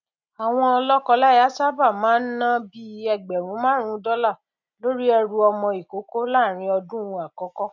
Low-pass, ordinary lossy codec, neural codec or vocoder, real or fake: 7.2 kHz; none; none; real